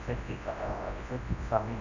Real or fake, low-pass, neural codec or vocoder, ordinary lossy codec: fake; 7.2 kHz; codec, 24 kHz, 0.9 kbps, WavTokenizer, large speech release; none